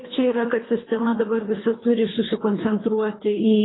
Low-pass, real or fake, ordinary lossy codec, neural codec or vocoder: 7.2 kHz; fake; AAC, 16 kbps; codec, 24 kHz, 3 kbps, HILCodec